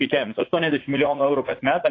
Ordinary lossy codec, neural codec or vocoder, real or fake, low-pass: AAC, 32 kbps; vocoder, 44.1 kHz, 80 mel bands, Vocos; fake; 7.2 kHz